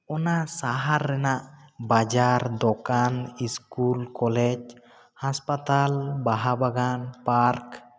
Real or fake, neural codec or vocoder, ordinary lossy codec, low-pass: real; none; none; none